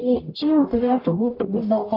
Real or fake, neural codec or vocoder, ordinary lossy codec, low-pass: fake; codec, 44.1 kHz, 0.9 kbps, DAC; AAC, 32 kbps; 5.4 kHz